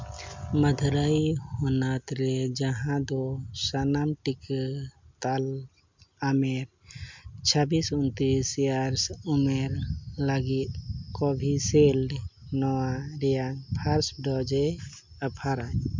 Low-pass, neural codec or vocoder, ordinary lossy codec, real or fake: 7.2 kHz; none; MP3, 64 kbps; real